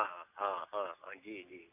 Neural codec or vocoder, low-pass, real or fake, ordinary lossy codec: none; 3.6 kHz; real; none